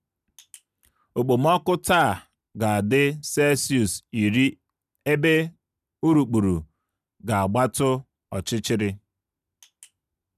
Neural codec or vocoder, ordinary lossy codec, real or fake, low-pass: none; none; real; 14.4 kHz